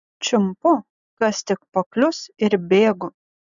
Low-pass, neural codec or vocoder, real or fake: 7.2 kHz; none; real